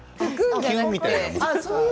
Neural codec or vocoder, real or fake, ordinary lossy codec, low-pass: none; real; none; none